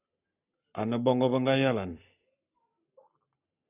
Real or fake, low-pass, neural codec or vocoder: fake; 3.6 kHz; codec, 44.1 kHz, 7.8 kbps, Pupu-Codec